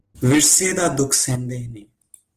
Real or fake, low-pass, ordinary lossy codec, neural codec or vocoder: real; 14.4 kHz; Opus, 16 kbps; none